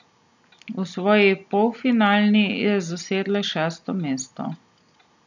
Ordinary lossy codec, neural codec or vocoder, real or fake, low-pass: none; none; real; 7.2 kHz